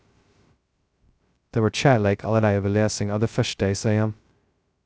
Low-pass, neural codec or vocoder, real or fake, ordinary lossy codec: none; codec, 16 kHz, 0.2 kbps, FocalCodec; fake; none